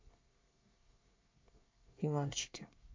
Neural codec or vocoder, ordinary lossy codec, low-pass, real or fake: codec, 24 kHz, 1 kbps, SNAC; MP3, 48 kbps; 7.2 kHz; fake